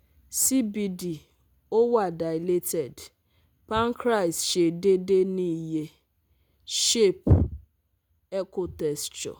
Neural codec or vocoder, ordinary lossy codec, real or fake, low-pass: none; none; real; none